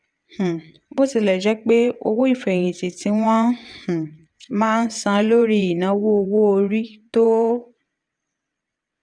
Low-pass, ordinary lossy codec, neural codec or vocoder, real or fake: 9.9 kHz; none; vocoder, 22.05 kHz, 80 mel bands, WaveNeXt; fake